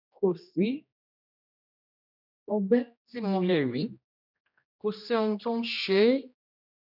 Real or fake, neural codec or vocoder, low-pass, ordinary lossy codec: fake; codec, 16 kHz, 1 kbps, X-Codec, HuBERT features, trained on general audio; 5.4 kHz; none